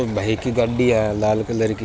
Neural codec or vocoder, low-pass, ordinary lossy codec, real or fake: none; none; none; real